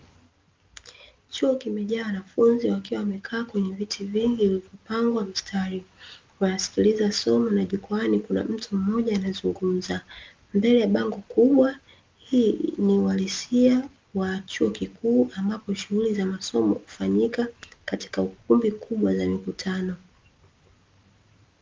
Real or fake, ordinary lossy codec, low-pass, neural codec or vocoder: real; Opus, 32 kbps; 7.2 kHz; none